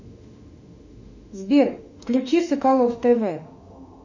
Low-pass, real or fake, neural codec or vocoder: 7.2 kHz; fake; autoencoder, 48 kHz, 32 numbers a frame, DAC-VAE, trained on Japanese speech